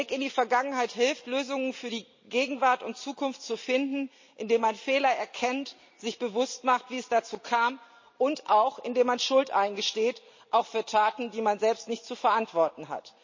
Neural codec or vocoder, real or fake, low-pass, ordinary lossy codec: none; real; 7.2 kHz; MP3, 32 kbps